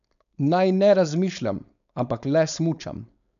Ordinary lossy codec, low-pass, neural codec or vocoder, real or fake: none; 7.2 kHz; codec, 16 kHz, 4.8 kbps, FACodec; fake